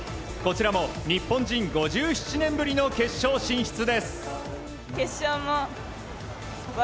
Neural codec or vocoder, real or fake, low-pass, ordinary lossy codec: none; real; none; none